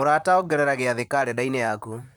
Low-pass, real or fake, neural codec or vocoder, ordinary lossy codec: none; fake; vocoder, 44.1 kHz, 128 mel bands, Pupu-Vocoder; none